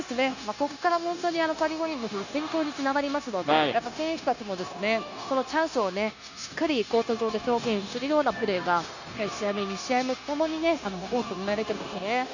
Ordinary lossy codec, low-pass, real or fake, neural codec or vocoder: none; 7.2 kHz; fake; codec, 16 kHz, 0.9 kbps, LongCat-Audio-Codec